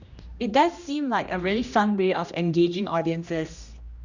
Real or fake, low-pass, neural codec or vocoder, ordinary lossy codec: fake; 7.2 kHz; codec, 16 kHz, 1 kbps, X-Codec, HuBERT features, trained on general audio; Opus, 64 kbps